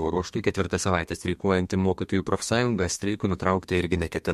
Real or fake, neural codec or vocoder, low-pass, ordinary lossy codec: fake; codec, 32 kHz, 1.9 kbps, SNAC; 14.4 kHz; MP3, 64 kbps